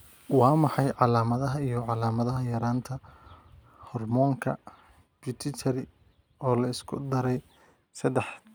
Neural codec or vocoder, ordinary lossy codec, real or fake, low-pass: none; none; real; none